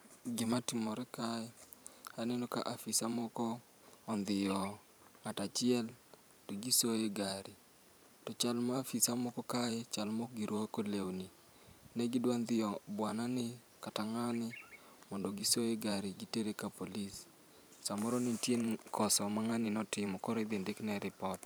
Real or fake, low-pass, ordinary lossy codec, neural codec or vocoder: fake; none; none; vocoder, 44.1 kHz, 128 mel bands every 512 samples, BigVGAN v2